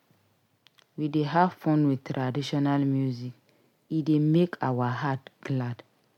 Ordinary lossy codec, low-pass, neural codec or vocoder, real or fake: MP3, 96 kbps; 19.8 kHz; none; real